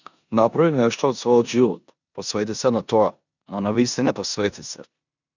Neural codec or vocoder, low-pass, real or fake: codec, 16 kHz in and 24 kHz out, 0.9 kbps, LongCat-Audio-Codec, four codebook decoder; 7.2 kHz; fake